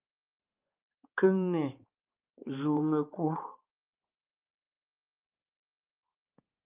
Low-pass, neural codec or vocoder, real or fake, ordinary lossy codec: 3.6 kHz; codec, 16 kHz, 4 kbps, X-Codec, HuBERT features, trained on balanced general audio; fake; Opus, 24 kbps